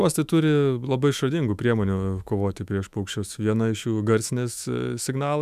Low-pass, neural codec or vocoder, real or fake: 14.4 kHz; autoencoder, 48 kHz, 128 numbers a frame, DAC-VAE, trained on Japanese speech; fake